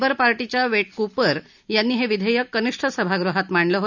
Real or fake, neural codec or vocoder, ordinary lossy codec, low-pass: real; none; none; 7.2 kHz